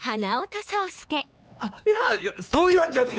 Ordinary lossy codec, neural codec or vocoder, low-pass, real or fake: none; codec, 16 kHz, 4 kbps, X-Codec, HuBERT features, trained on LibriSpeech; none; fake